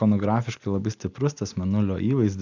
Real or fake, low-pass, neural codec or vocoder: real; 7.2 kHz; none